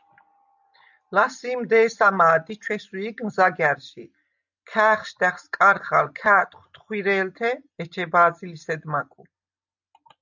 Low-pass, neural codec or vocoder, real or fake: 7.2 kHz; none; real